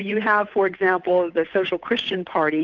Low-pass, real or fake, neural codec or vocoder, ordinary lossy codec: 7.2 kHz; fake; codec, 16 kHz, 8 kbps, FunCodec, trained on Chinese and English, 25 frames a second; Opus, 24 kbps